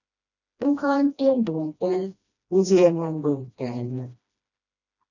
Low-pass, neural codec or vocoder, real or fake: 7.2 kHz; codec, 16 kHz, 1 kbps, FreqCodec, smaller model; fake